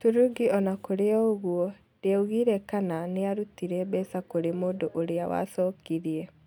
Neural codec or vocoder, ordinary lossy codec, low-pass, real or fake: none; none; 19.8 kHz; real